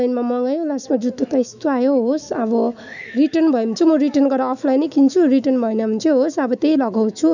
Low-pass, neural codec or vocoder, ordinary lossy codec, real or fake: 7.2 kHz; autoencoder, 48 kHz, 128 numbers a frame, DAC-VAE, trained on Japanese speech; none; fake